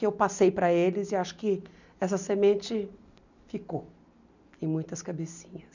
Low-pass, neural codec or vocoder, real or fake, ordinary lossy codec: 7.2 kHz; none; real; none